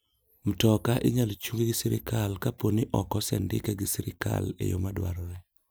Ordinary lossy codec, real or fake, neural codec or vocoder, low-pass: none; real; none; none